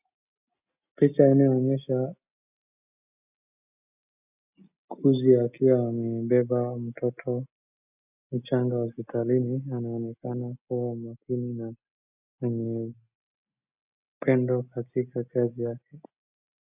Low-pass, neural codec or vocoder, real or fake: 3.6 kHz; none; real